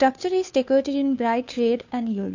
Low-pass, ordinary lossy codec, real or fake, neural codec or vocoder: 7.2 kHz; AAC, 48 kbps; fake; codec, 16 kHz, 2 kbps, FunCodec, trained on Chinese and English, 25 frames a second